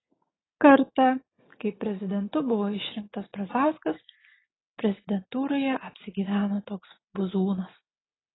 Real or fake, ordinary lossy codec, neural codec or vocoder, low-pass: real; AAC, 16 kbps; none; 7.2 kHz